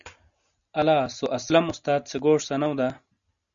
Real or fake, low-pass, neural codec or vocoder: real; 7.2 kHz; none